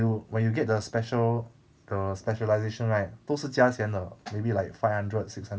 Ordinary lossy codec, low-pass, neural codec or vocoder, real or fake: none; none; none; real